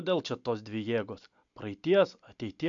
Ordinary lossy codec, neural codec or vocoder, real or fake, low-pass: MP3, 64 kbps; none; real; 7.2 kHz